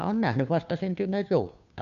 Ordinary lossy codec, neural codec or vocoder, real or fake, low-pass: none; codec, 16 kHz, 2 kbps, FunCodec, trained on Chinese and English, 25 frames a second; fake; 7.2 kHz